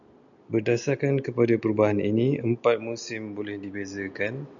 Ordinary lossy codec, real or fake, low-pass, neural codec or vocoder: MP3, 96 kbps; real; 7.2 kHz; none